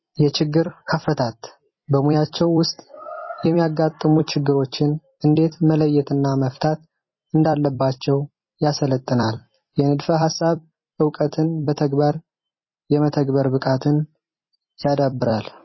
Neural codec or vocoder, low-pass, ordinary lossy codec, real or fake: vocoder, 44.1 kHz, 128 mel bands every 256 samples, BigVGAN v2; 7.2 kHz; MP3, 24 kbps; fake